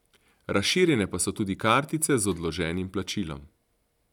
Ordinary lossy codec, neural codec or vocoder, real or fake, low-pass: none; none; real; 19.8 kHz